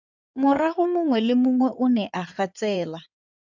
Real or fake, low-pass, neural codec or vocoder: fake; 7.2 kHz; codec, 16 kHz in and 24 kHz out, 2.2 kbps, FireRedTTS-2 codec